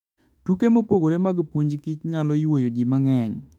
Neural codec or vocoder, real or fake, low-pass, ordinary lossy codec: autoencoder, 48 kHz, 32 numbers a frame, DAC-VAE, trained on Japanese speech; fake; 19.8 kHz; MP3, 96 kbps